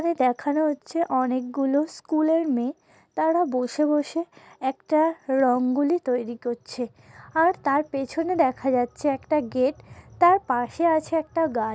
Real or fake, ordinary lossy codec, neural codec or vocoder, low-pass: real; none; none; none